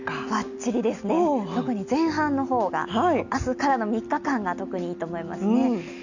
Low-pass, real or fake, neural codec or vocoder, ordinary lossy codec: 7.2 kHz; real; none; none